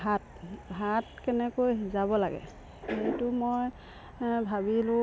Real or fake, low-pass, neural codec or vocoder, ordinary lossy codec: real; none; none; none